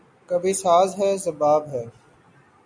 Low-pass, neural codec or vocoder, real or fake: 9.9 kHz; none; real